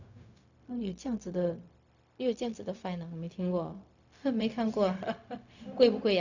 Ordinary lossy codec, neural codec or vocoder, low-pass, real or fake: none; codec, 16 kHz, 0.4 kbps, LongCat-Audio-Codec; 7.2 kHz; fake